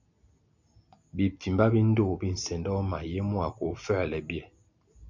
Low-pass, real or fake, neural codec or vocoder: 7.2 kHz; real; none